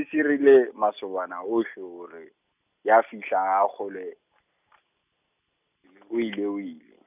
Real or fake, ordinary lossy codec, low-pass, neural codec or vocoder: real; none; 3.6 kHz; none